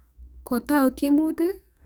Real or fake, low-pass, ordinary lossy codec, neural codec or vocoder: fake; none; none; codec, 44.1 kHz, 2.6 kbps, SNAC